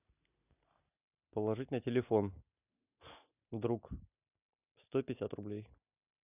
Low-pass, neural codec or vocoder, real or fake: 3.6 kHz; none; real